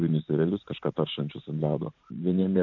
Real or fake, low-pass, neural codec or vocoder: fake; 7.2 kHz; vocoder, 44.1 kHz, 128 mel bands every 512 samples, BigVGAN v2